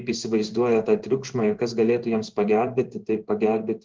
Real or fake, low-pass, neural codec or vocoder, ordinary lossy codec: fake; 7.2 kHz; codec, 16 kHz in and 24 kHz out, 1 kbps, XY-Tokenizer; Opus, 16 kbps